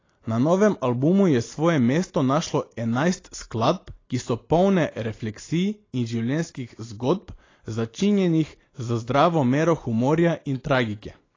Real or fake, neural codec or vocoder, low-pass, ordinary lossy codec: real; none; 7.2 kHz; AAC, 32 kbps